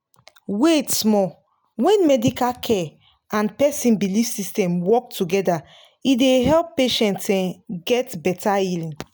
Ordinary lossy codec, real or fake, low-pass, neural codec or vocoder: none; real; none; none